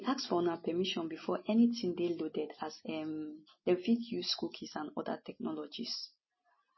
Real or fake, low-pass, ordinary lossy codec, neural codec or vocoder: real; 7.2 kHz; MP3, 24 kbps; none